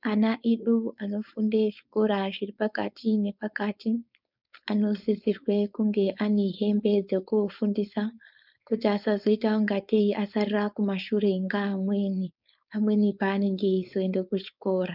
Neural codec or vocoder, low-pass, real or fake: codec, 16 kHz, 4.8 kbps, FACodec; 5.4 kHz; fake